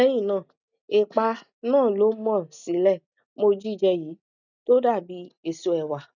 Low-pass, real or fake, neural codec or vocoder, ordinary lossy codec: 7.2 kHz; real; none; none